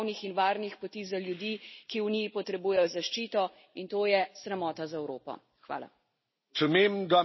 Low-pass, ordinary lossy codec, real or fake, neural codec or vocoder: 7.2 kHz; MP3, 24 kbps; real; none